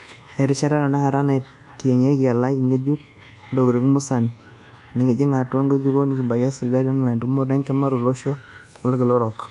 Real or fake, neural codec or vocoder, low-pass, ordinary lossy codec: fake; codec, 24 kHz, 1.2 kbps, DualCodec; 10.8 kHz; none